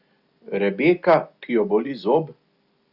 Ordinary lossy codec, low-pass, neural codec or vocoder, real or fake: Opus, 64 kbps; 5.4 kHz; none; real